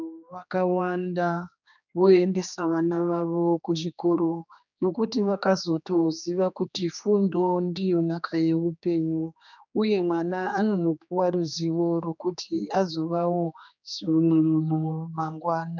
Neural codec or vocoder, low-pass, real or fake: codec, 16 kHz, 2 kbps, X-Codec, HuBERT features, trained on general audio; 7.2 kHz; fake